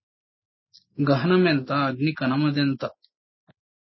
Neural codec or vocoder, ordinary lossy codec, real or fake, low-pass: none; MP3, 24 kbps; real; 7.2 kHz